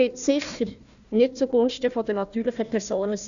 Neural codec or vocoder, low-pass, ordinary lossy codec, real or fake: codec, 16 kHz, 1 kbps, FunCodec, trained on Chinese and English, 50 frames a second; 7.2 kHz; none; fake